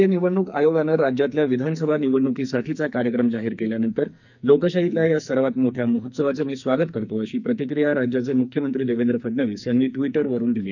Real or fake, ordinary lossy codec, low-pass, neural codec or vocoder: fake; none; 7.2 kHz; codec, 44.1 kHz, 2.6 kbps, SNAC